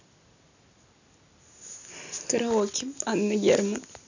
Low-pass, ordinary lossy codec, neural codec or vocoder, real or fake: 7.2 kHz; none; none; real